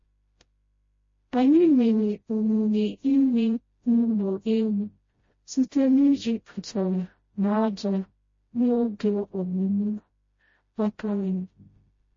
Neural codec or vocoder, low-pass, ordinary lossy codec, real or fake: codec, 16 kHz, 0.5 kbps, FreqCodec, smaller model; 7.2 kHz; MP3, 32 kbps; fake